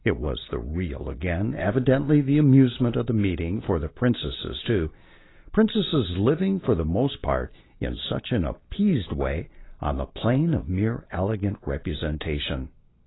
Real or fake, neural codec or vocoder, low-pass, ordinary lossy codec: real; none; 7.2 kHz; AAC, 16 kbps